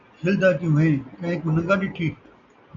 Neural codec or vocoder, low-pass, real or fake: none; 7.2 kHz; real